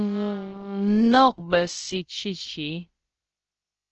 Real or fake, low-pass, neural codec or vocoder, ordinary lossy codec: fake; 7.2 kHz; codec, 16 kHz, about 1 kbps, DyCAST, with the encoder's durations; Opus, 16 kbps